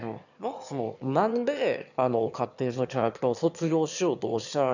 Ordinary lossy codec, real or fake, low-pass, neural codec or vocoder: none; fake; 7.2 kHz; autoencoder, 22.05 kHz, a latent of 192 numbers a frame, VITS, trained on one speaker